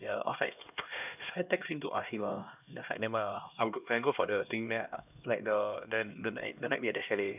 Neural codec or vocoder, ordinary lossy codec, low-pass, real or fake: codec, 16 kHz, 1 kbps, X-Codec, HuBERT features, trained on LibriSpeech; none; 3.6 kHz; fake